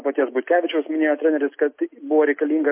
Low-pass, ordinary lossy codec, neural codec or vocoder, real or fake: 3.6 kHz; MP3, 24 kbps; none; real